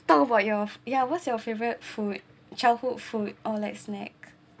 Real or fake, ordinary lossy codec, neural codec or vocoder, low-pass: real; none; none; none